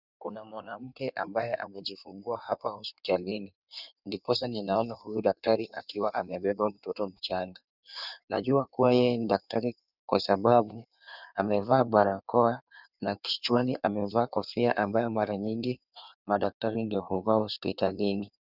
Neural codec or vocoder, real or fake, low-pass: codec, 16 kHz in and 24 kHz out, 1.1 kbps, FireRedTTS-2 codec; fake; 5.4 kHz